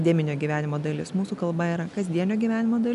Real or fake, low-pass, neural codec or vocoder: real; 10.8 kHz; none